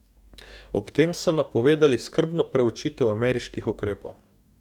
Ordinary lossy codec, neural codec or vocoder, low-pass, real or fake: none; codec, 44.1 kHz, 2.6 kbps, DAC; 19.8 kHz; fake